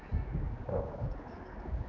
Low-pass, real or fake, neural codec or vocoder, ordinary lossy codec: 7.2 kHz; fake; codec, 16 kHz, 4 kbps, X-Codec, HuBERT features, trained on general audio; none